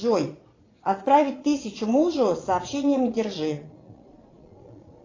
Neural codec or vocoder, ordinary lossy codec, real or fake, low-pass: vocoder, 22.05 kHz, 80 mel bands, Vocos; AAC, 32 kbps; fake; 7.2 kHz